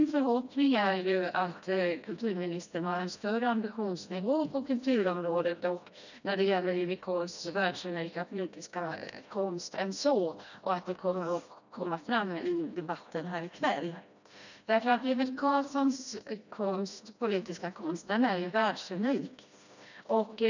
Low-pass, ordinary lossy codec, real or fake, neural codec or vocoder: 7.2 kHz; none; fake; codec, 16 kHz, 1 kbps, FreqCodec, smaller model